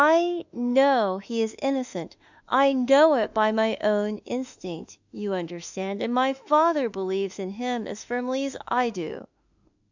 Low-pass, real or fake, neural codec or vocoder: 7.2 kHz; fake; autoencoder, 48 kHz, 32 numbers a frame, DAC-VAE, trained on Japanese speech